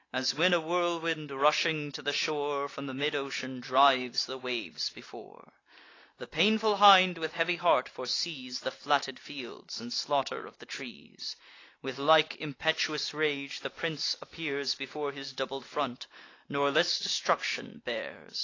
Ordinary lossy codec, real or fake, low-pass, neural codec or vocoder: AAC, 32 kbps; real; 7.2 kHz; none